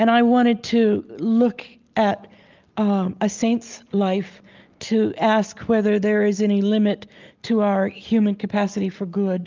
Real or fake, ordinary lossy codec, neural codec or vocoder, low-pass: real; Opus, 24 kbps; none; 7.2 kHz